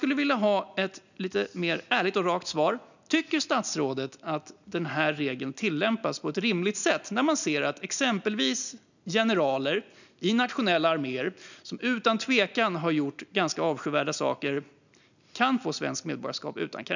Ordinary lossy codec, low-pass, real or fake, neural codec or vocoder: none; 7.2 kHz; real; none